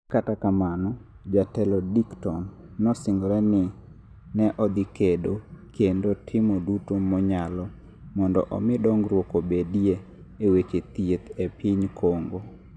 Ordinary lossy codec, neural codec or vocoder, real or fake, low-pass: none; none; real; none